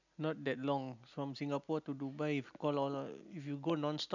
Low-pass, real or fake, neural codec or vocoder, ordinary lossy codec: 7.2 kHz; real; none; none